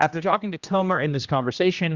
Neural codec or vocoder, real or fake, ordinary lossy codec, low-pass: codec, 16 kHz, 1 kbps, X-Codec, HuBERT features, trained on general audio; fake; Opus, 64 kbps; 7.2 kHz